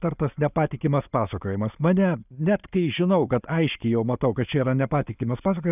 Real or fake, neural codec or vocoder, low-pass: real; none; 3.6 kHz